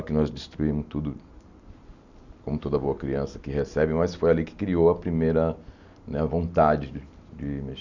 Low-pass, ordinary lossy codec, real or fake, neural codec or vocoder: 7.2 kHz; none; real; none